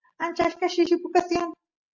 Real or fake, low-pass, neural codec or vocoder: real; 7.2 kHz; none